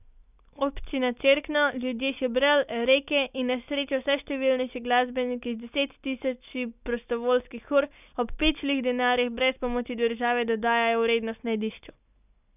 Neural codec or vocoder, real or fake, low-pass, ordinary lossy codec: none; real; 3.6 kHz; none